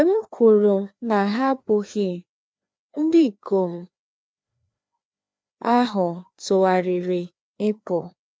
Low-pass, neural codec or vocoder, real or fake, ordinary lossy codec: none; codec, 16 kHz, 2 kbps, FreqCodec, larger model; fake; none